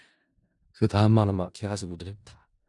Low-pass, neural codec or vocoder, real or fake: 10.8 kHz; codec, 16 kHz in and 24 kHz out, 0.4 kbps, LongCat-Audio-Codec, four codebook decoder; fake